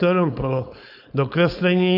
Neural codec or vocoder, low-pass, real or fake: codec, 16 kHz, 4.8 kbps, FACodec; 5.4 kHz; fake